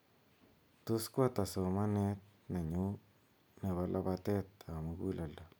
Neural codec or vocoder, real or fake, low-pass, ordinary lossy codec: none; real; none; none